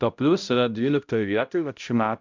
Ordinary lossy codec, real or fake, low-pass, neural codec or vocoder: MP3, 64 kbps; fake; 7.2 kHz; codec, 16 kHz, 0.5 kbps, X-Codec, HuBERT features, trained on balanced general audio